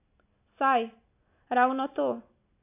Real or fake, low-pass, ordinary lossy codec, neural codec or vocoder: real; 3.6 kHz; AAC, 32 kbps; none